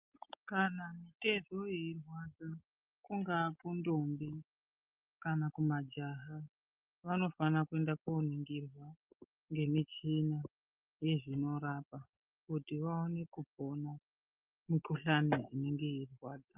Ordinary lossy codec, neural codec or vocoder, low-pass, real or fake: Opus, 24 kbps; none; 3.6 kHz; real